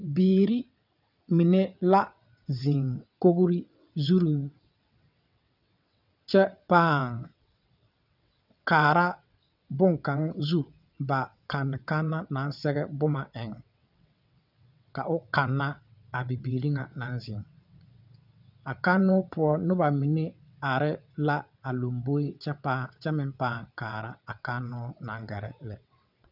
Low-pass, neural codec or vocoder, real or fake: 5.4 kHz; vocoder, 22.05 kHz, 80 mel bands, WaveNeXt; fake